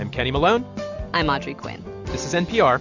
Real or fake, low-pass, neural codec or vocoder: real; 7.2 kHz; none